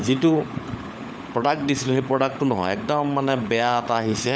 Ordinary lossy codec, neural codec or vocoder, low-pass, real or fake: none; codec, 16 kHz, 16 kbps, FunCodec, trained on LibriTTS, 50 frames a second; none; fake